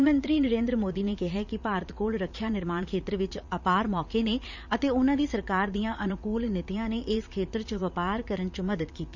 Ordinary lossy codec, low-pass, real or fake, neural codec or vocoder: none; 7.2 kHz; fake; vocoder, 44.1 kHz, 80 mel bands, Vocos